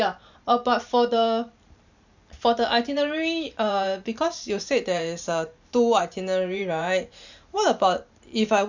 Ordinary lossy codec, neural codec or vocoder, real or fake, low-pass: none; vocoder, 44.1 kHz, 128 mel bands every 512 samples, BigVGAN v2; fake; 7.2 kHz